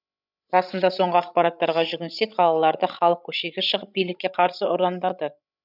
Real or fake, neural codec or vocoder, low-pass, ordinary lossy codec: fake; codec, 16 kHz, 16 kbps, FreqCodec, larger model; 5.4 kHz; none